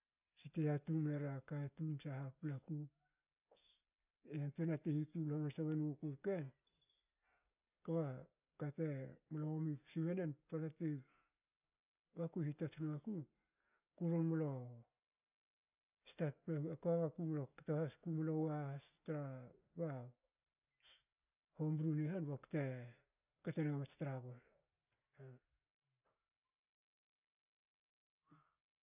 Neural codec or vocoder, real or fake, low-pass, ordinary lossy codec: none; real; 3.6 kHz; none